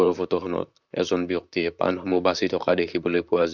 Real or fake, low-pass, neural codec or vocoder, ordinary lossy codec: fake; 7.2 kHz; vocoder, 44.1 kHz, 128 mel bands, Pupu-Vocoder; none